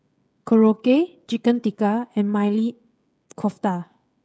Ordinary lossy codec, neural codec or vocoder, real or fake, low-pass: none; codec, 16 kHz, 16 kbps, FreqCodec, smaller model; fake; none